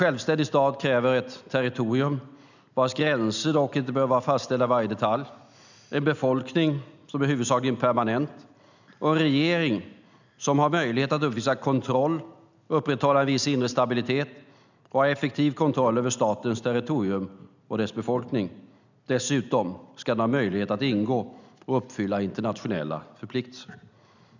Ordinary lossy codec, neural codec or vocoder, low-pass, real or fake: none; none; 7.2 kHz; real